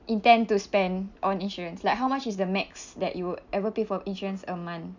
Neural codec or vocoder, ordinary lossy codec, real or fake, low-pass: none; none; real; 7.2 kHz